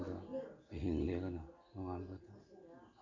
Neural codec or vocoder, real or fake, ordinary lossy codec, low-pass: none; real; none; 7.2 kHz